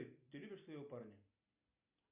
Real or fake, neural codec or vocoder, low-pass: real; none; 3.6 kHz